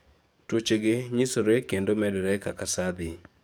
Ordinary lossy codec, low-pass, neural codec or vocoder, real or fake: none; none; codec, 44.1 kHz, 7.8 kbps, DAC; fake